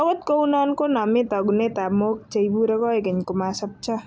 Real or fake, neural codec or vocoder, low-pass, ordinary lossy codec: real; none; none; none